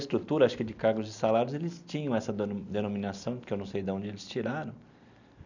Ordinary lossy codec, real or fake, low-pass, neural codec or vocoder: none; real; 7.2 kHz; none